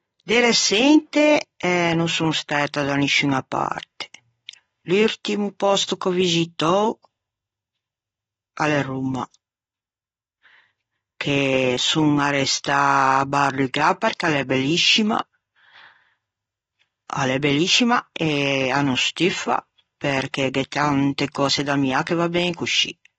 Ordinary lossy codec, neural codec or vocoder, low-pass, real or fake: AAC, 24 kbps; none; 10.8 kHz; real